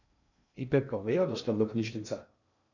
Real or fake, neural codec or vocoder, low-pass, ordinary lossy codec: fake; codec, 16 kHz in and 24 kHz out, 0.6 kbps, FocalCodec, streaming, 2048 codes; 7.2 kHz; none